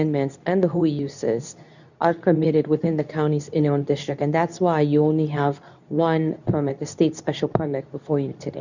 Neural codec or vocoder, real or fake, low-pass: codec, 24 kHz, 0.9 kbps, WavTokenizer, medium speech release version 2; fake; 7.2 kHz